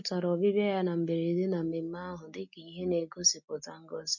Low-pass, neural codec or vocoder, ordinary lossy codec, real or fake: 7.2 kHz; none; none; real